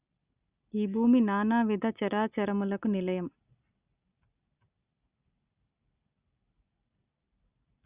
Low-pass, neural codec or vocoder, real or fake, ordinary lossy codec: 3.6 kHz; none; real; Opus, 64 kbps